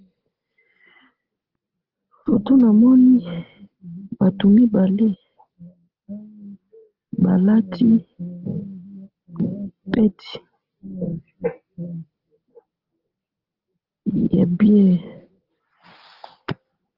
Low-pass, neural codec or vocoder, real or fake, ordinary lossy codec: 5.4 kHz; none; real; Opus, 16 kbps